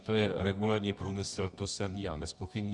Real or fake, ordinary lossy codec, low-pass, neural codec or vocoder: fake; Opus, 64 kbps; 10.8 kHz; codec, 24 kHz, 0.9 kbps, WavTokenizer, medium music audio release